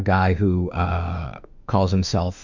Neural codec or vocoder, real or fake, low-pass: autoencoder, 48 kHz, 32 numbers a frame, DAC-VAE, trained on Japanese speech; fake; 7.2 kHz